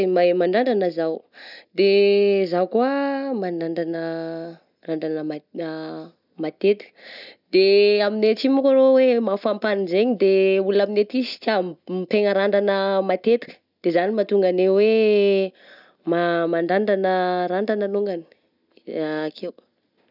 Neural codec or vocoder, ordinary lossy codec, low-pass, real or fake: none; none; 5.4 kHz; real